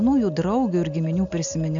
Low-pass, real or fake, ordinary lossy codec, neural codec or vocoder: 7.2 kHz; real; AAC, 64 kbps; none